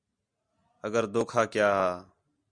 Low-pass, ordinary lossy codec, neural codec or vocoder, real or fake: 9.9 kHz; Opus, 64 kbps; none; real